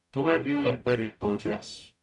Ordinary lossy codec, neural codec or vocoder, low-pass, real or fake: none; codec, 44.1 kHz, 0.9 kbps, DAC; 10.8 kHz; fake